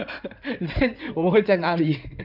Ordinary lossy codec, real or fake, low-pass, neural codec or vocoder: none; fake; 5.4 kHz; codec, 16 kHz in and 24 kHz out, 2.2 kbps, FireRedTTS-2 codec